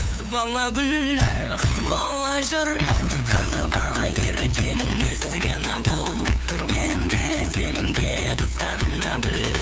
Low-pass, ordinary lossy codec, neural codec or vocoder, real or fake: none; none; codec, 16 kHz, 2 kbps, FunCodec, trained on LibriTTS, 25 frames a second; fake